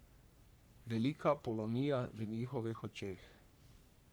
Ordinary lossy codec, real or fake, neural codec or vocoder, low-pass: none; fake; codec, 44.1 kHz, 3.4 kbps, Pupu-Codec; none